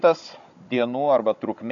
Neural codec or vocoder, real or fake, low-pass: codec, 16 kHz, 16 kbps, FunCodec, trained on Chinese and English, 50 frames a second; fake; 7.2 kHz